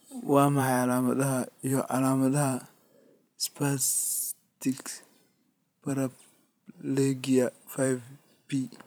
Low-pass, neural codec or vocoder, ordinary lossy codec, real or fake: none; vocoder, 44.1 kHz, 128 mel bands every 512 samples, BigVGAN v2; none; fake